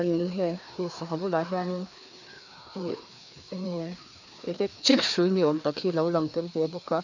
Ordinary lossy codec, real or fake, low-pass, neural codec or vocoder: none; fake; 7.2 kHz; codec, 16 kHz, 2 kbps, FreqCodec, larger model